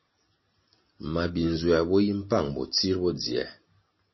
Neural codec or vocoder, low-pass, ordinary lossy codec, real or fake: none; 7.2 kHz; MP3, 24 kbps; real